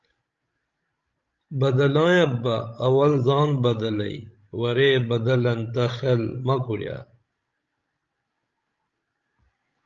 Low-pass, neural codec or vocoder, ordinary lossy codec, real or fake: 7.2 kHz; codec, 16 kHz, 16 kbps, FreqCodec, larger model; Opus, 24 kbps; fake